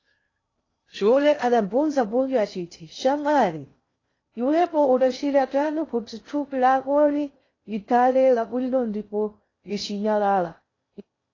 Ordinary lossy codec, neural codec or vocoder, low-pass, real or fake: AAC, 32 kbps; codec, 16 kHz in and 24 kHz out, 0.6 kbps, FocalCodec, streaming, 2048 codes; 7.2 kHz; fake